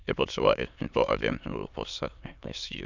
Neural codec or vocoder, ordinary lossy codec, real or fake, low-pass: autoencoder, 22.05 kHz, a latent of 192 numbers a frame, VITS, trained on many speakers; none; fake; 7.2 kHz